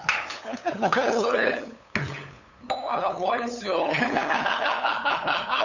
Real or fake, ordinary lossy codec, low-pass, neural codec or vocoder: fake; none; 7.2 kHz; codec, 16 kHz, 8 kbps, FunCodec, trained on LibriTTS, 25 frames a second